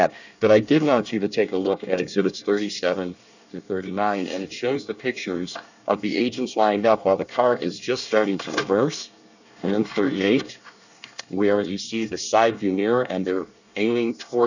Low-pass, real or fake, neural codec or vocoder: 7.2 kHz; fake; codec, 24 kHz, 1 kbps, SNAC